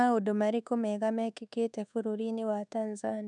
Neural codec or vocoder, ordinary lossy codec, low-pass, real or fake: codec, 24 kHz, 1.2 kbps, DualCodec; none; 10.8 kHz; fake